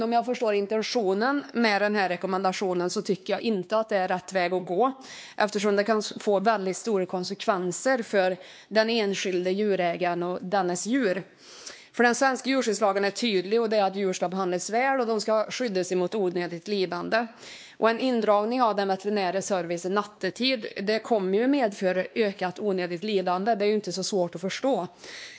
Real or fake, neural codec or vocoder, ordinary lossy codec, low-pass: fake; codec, 16 kHz, 2 kbps, X-Codec, WavLM features, trained on Multilingual LibriSpeech; none; none